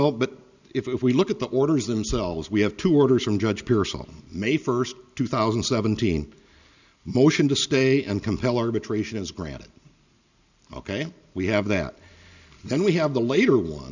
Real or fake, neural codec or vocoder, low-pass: real; none; 7.2 kHz